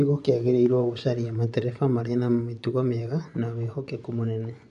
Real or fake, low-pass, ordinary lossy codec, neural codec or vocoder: fake; 10.8 kHz; none; vocoder, 24 kHz, 100 mel bands, Vocos